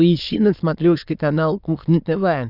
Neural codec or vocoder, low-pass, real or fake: autoencoder, 22.05 kHz, a latent of 192 numbers a frame, VITS, trained on many speakers; 5.4 kHz; fake